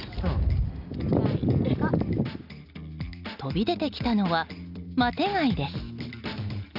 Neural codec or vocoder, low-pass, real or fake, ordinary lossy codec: none; 5.4 kHz; real; none